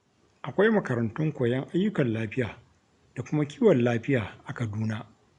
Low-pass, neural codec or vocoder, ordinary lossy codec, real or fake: 10.8 kHz; none; none; real